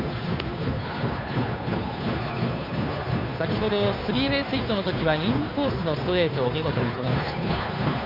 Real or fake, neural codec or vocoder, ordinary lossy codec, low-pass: fake; codec, 16 kHz, 2 kbps, FunCodec, trained on Chinese and English, 25 frames a second; none; 5.4 kHz